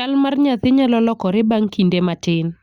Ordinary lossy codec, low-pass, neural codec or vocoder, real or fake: Opus, 64 kbps; 19.8 kHz; none; real